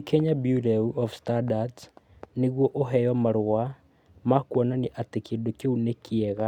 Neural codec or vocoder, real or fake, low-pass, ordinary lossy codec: none; real; 19.8 kHz; none